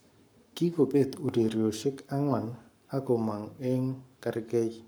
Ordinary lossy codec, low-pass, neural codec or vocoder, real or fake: none; none; codec, 44.1 kHz, 7.8 kbps, Pupu-Codec; fake